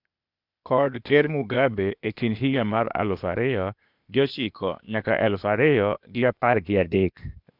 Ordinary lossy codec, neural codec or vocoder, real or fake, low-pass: none; codec, 16 kHz, 0.8 kbps, ZipCodec; fake; 5.4 kHz